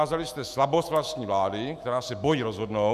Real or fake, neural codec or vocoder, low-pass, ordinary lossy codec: fake; autoencoder, 48 kHz, 128 numbers a frame, DAC-VAE, trained on Japanese speech; 14.4 kHz; AAC, 96 kbps